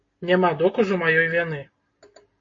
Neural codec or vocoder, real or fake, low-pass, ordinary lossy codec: none; real; 7.2 kHz; AAC, 32 kbps